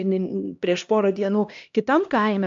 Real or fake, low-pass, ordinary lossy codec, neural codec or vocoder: fake; 7.2 kHz; AAC, 64 kbps; codec, 16 kHz, 1 kbps, X-Codec, HuBERT features, trained on LibriSpeech